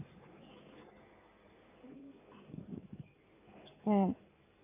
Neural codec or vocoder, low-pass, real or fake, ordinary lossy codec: codec, 16 kHz in and 24 kHz out, 2.2 kbps, FireRedTTS-2 codec; 3.6 kHz; fake; none